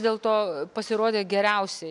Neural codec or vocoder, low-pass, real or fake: none; 10.8 kHz; real